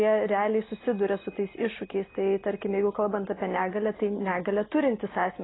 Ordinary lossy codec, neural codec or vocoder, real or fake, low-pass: AAC, 16 kbps; none; real; 7.2 kHz